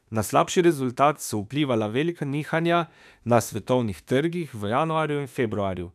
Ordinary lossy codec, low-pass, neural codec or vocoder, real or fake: none; 14.4 kHz; autoencoder, 48 kHz, 32 numbers a frame, DAC-VAE, trained on Japanese speech; fake